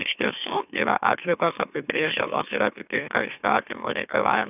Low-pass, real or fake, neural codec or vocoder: 3.6 kHz; fake; autoencoder, 44.1 kHz, a latent of 192 numbers a frame, MeloTTS